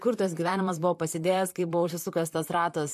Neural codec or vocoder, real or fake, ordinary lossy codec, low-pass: vocoder, 44.1 kHz, 128 mel bands, Pupu-Vocoder; fake; MP3, 64 kbps; 14.4 kHz